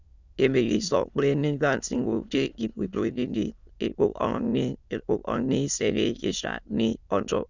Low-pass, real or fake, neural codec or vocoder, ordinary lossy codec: 7.2 kHz; fake; autoencoder, 22.05 kHz, a latent of 192 numbers a frame, VITS, trained on many speakers; Opus, 64 kbps